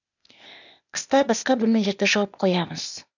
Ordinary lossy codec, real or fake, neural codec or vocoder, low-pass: none; fake; codec, 16 kHz, 0.8 kbps, ZipCodec; 7.2 kHz